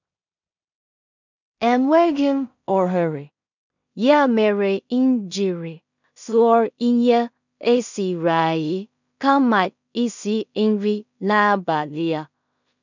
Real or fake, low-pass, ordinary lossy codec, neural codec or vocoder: fake; 7.2 kHz; none; codec, 16 kHz in and 24 kHz out, 0.4 kbps, LongCat-Audio-Codec, two codebook decoder